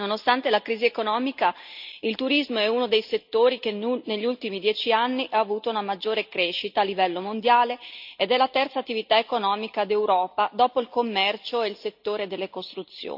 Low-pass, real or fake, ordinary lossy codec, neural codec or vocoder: 5.4 kHz; real; none; none